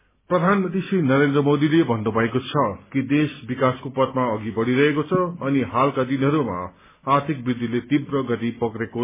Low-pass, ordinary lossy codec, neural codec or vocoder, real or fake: 3.6 kHz; MP3, 16 kbps; none; real